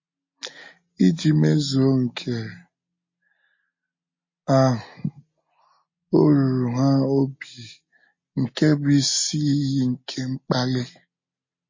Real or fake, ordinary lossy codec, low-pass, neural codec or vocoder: real; MP3, 32 kbps; 7.2 kHz; none